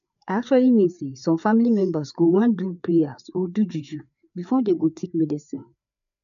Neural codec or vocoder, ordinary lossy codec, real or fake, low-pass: codec, 16 kHz, 4 kbps, FreqCodec, larger model; MP3, 96 kbps; fake; 7.2 kHz